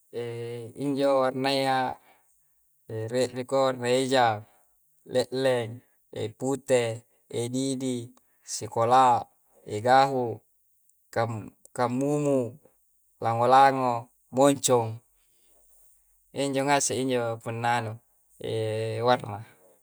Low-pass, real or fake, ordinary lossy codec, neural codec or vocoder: none; real; none; none